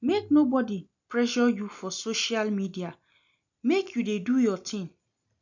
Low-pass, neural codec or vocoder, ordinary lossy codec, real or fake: 7.2 kHz; none; none; real